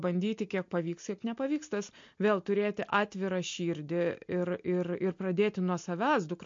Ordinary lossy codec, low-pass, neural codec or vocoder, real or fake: MP3, 48 kbps; 7.2 kHz; none; real